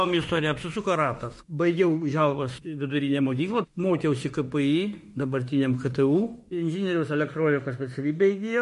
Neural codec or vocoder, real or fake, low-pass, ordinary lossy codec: autoencoder, 48 kHz, 32 numbers a frame, DAC-VAE, trained on Japanese speech; fake; 14.4 kHz; MP3, 48 kbps